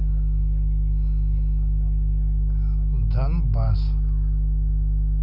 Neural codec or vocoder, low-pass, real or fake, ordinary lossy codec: none; 5.4 kHz; real; none